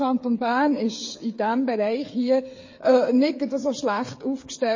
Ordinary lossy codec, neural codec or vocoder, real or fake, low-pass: MP3, 32 kbps; codec, 16 kHz, 8 kbps, FreqCodec, smaller model; fake; 7.2 kHz